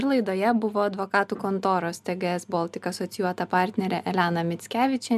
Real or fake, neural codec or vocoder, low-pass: real; none; 14.4 kHz